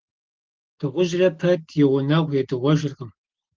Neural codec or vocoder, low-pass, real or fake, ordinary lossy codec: none; 7.2 kHz; real; Opus, 16 kbps